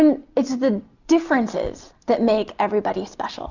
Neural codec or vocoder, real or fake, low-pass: none; real; 7.2 kHz